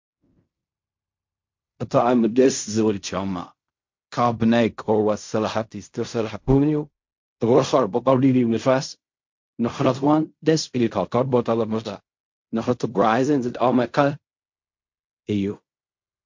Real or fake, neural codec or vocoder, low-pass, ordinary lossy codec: fake; codec, 16 kHz in and 24 kHz out, 0.4 kbps, LongCat-Audio-Codec, fine tuned four codebook decoder; 7.2 kHz; MP3, 48 kbps